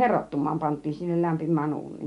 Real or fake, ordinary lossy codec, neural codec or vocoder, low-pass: real; MP3, 64 kbps; none; 10.8 kHz